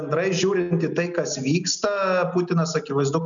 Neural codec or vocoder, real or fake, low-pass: none; real; 7.2 kHz